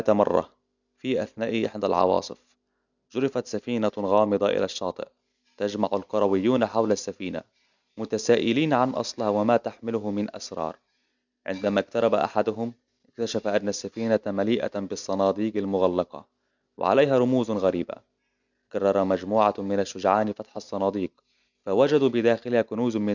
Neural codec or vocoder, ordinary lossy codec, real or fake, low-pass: none; none; real; 7.2 kHz